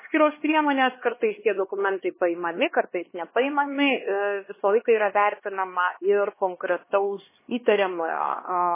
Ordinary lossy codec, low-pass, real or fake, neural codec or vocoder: MP3, 16 kbps; 3.6 kHz; fake; codec, 16 kHz, 2 kbps, X-Codec, HuBERT features, trained on LibriSpeech